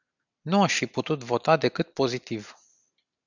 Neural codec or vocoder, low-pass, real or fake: none; 7.2 kHz; real